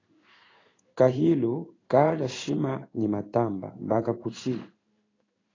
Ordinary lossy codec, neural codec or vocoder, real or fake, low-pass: AAC, 32 kbps; codec, 16 kHz in and 24 kHz out, 1 kbps, XY-Tokenizer; fake; 7.2 kHz